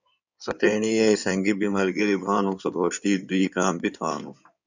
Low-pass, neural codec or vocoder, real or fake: 7.2 kHz; codec, 16 kHz in and 24 kHz out, 2.2 kbps, FireRedTTS-2 codec; fake